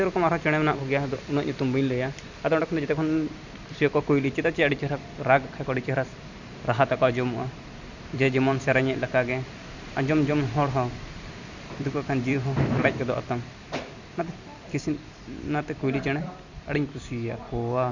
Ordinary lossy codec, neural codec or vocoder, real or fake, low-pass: Opus, 64 kbps; vocoder, 44.1 kHz, 128 mel bands every 256 samples, BigVGAN v2; fake; 7.2 kHz